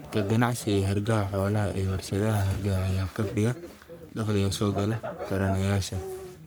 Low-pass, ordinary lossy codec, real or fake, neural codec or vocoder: none; none; fake; codec, 44.1 kHz, 3.4 kbps, Pupu-Codec